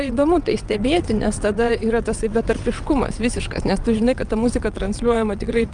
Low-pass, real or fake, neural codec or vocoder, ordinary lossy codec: 9.9 kHz; fake; vocoder, 22.05 kHz, 80 mel bands, WaveNeXt; Opus, 32 kbps